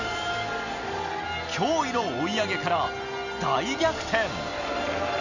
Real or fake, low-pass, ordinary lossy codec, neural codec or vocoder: real; 7.2 kHz; none; none